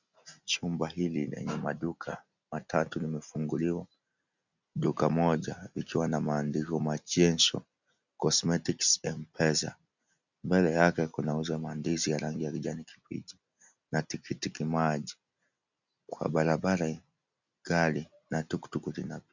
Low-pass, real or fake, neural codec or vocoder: 7.2 kHz; real; none